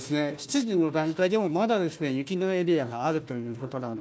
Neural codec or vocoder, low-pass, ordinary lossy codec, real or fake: codec, 16 kHz, 1 kbps, FunCodec, trained on Chinese and English, 50 frames a second; none; none; fake